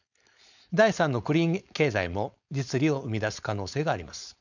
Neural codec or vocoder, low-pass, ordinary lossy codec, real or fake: codec, 16 kHz, 4.8 kbps, FACodec; 7.2 kHz; none; fake